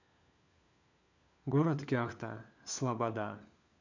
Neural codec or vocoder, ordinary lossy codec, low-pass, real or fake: codec, 16 kHz, 4 kbps, FunCodec, trained on LibriTTS, 50 frames a second; none; 7.2 kHz; fake